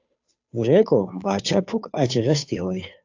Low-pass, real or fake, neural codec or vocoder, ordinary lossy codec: 7.2 kHz; fake; codec, 16 kHz, 2 kbps, FunCodec, trained on Chinese and English, 25 frames a second; AAC, 48 kbps